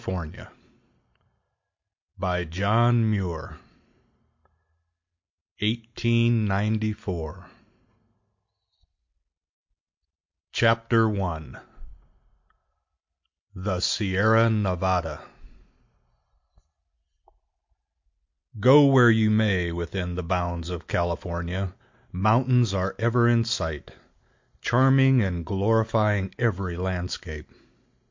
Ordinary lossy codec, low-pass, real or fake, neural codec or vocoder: MP3, 48 kbps; 7.2 kHz; real; none